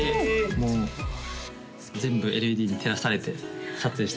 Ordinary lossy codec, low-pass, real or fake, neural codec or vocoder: none; none; real; none